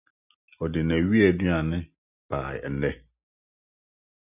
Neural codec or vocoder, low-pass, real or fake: none; 3.6 kHz; real